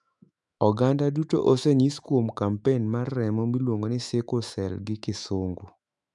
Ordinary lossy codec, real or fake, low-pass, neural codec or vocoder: none; fake; 10.8 kHz; autoencoder, 48 kHz, 128 numbers a frame, DAC-VAE, trained on Japanese speech